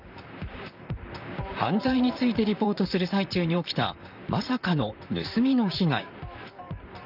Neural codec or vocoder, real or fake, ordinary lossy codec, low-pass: vocoder, 44.1 kHz, 128 mel bands, Pupu-Vocoder; fake; none; 5.4 kHz